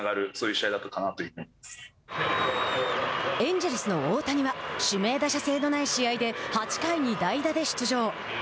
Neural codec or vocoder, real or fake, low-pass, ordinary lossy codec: none; real; none; none